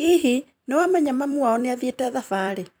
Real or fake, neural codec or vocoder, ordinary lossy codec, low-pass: fake; vocoder, 44.1 kHz, 128 mel bands every 256 samples, BigVGAN v2; none; none